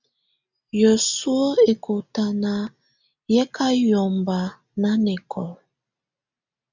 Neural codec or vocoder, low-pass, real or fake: none; 7.2 kHz; real